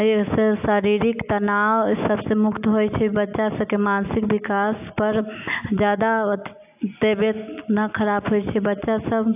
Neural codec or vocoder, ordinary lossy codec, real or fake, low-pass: none; AAC, 32 kbps; real; 3.6 kHz